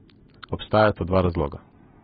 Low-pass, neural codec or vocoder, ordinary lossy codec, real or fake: 19.8 kHz; none; AAC, 16 kbps; real